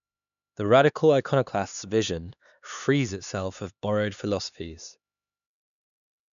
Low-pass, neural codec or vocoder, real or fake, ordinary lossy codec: 7.2 kHz; codec, 16 kHz, 2 kbps, X-Codec, HuBERT features, trained on LibriSpeech; fake; none